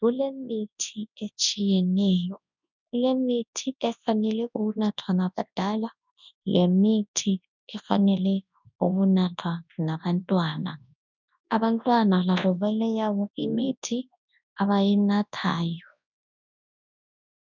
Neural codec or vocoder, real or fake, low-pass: codec, 24 kHz, 0.9 kbps, WavTokenizer, large speech release; fake; 7.2 kHz